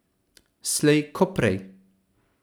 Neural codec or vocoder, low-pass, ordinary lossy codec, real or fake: none; none; none; real